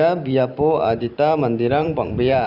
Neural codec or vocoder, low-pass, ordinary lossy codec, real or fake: vocoder, 44.1 kHz, 128 mel bands every 512 samples, BigVGAN v2; 5.4 kHz; none; fake